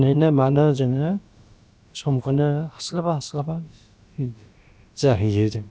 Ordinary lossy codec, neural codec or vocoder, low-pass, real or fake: none; codec, 16 kHz, about 1 kbps, DyCAST, with the encoder's durations; none; fake